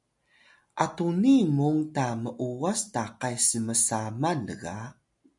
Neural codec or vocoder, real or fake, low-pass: none; real; 10.8 kHz